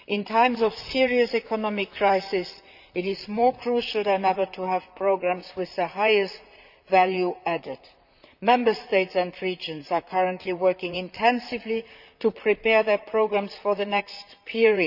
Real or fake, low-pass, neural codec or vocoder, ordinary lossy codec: fake; 5.4 kHz; vocoder, 44.1 kHz, 128 mel bands, Pupu-Vocoder; none